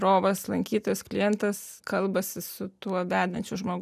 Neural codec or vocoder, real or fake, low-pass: none; real; 14.4 kHz